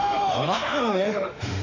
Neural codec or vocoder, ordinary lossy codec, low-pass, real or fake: autoencoder, 48 kHz, 32 numbers a frame, DAC-VAE, trained on Japanese speech; none; 7.2 kHz; fake